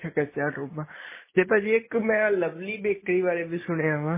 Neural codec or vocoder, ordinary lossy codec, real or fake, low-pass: none; MP3, 16 kbps; real; 3.6 kHz